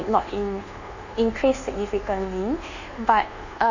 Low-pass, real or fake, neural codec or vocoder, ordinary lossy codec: 7.2 kHz; fake; codec, 24 kHz, 1.2 kbps, DualCodec; none